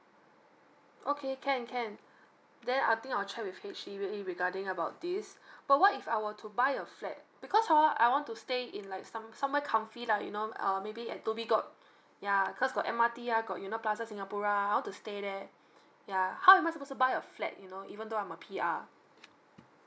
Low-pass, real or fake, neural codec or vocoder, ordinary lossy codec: none; real; none; none